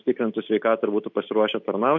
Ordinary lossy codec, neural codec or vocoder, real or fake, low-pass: MP3, 48 kbps; none; real; 7.2 kHz